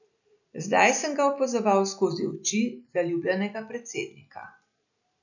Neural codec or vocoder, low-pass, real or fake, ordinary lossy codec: none; 7.2 kHz; real; none